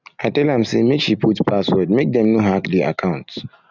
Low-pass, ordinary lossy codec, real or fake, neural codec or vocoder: 7.2 kHz; none; real; none